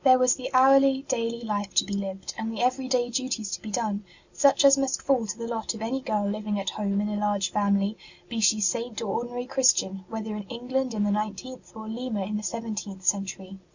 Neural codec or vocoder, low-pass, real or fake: none; 7.2 kHz; real